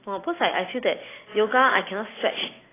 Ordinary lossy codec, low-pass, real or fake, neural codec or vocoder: AAC, 16 kbps; 3.6 kHz; real; none